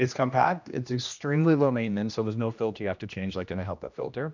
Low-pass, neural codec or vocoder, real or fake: 7.2 kHz; codec, 16 kHz, 1 kbps, X-Codec, HuBERT features, trained on general audio; fake